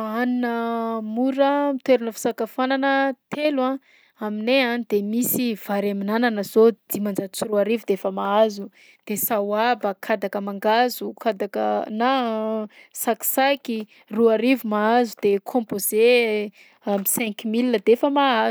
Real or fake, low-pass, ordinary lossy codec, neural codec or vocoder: real; none; none; none